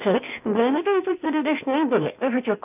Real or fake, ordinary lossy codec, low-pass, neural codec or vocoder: fake; none; 3.6 kHz; codec, 16 kHz, 0.8 kbps, ZipCodec